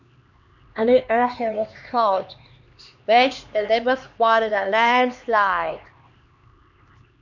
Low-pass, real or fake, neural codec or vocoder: 7.2 kHz; fake; codec, 16 kHz, 2 kbps, X-Codec, HuBERT features, trained on LibriSpeech